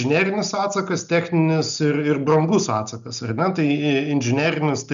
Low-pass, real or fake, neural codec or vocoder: 7.2 kHz; real; none